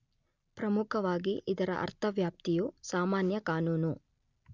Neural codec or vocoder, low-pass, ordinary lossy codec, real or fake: none; 7.2 kHz; none; real